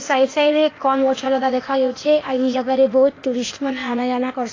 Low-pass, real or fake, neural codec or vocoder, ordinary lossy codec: 7.2 kHz; fake; codec, 16 kHz, 0.8 kbps, ZipCodec; AAC, 32 kbps